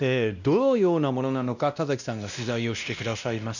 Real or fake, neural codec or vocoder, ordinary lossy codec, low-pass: fake; codec, 16 kHz, 1 kbps, X-Codec, WavLM features, trained on Multilingual LibriSpeech; none; 7.2 kHz